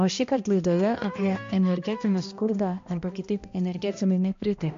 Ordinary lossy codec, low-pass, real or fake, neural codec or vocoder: MP3, 48 kbps; 7.2 kHz; fake; codec, 16 kHz, 1 kbps, X-Codec, HuBERT features, trained on balanced general audio